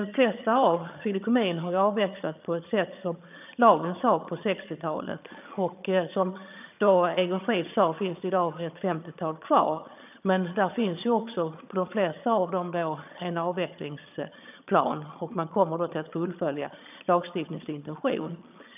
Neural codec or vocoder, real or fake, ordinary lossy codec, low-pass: vocoder, 22.05 kHz, 80 mel bands, HiFi-GAN; fake; none; 3.6 kHz